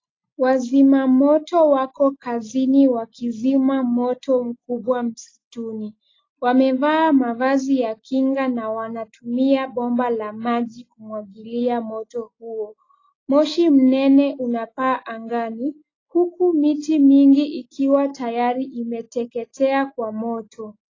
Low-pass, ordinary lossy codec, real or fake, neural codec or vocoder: 7.2 kHz; AAC, 32 kbps; real; none